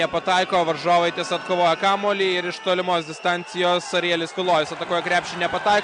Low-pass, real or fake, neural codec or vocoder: 9.9 kHz; real; none